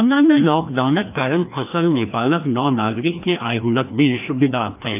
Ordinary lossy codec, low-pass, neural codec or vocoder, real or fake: AAC, 32 kbps; 3.6 kHz; codec, 16 kHz, 1 kbps, FreqCodec, larger model; fake